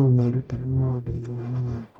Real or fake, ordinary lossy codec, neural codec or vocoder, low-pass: fake; none; codec, 44.1 kHz, 0.9 kbps, DAC; 19.8 kHz